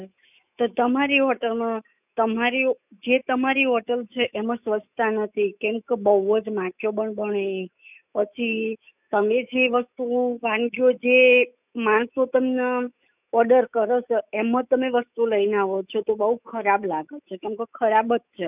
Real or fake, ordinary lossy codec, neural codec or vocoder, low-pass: fake; none; autoencoder, 48 kHz, 128 numbers a frame, DAC-VAE, trained on Japanese speech; 3.6 kHz